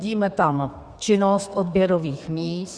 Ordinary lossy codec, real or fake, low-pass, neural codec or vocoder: Opus, 64 kbps; fake; 9.9 kHz; codec, 32 kHz, 1.9 kbps, SNAC